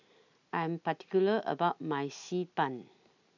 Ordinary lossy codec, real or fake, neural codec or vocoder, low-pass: none; real; none; 7.2 kHz